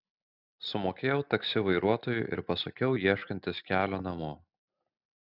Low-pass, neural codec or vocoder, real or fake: 5.4 kHz; none; real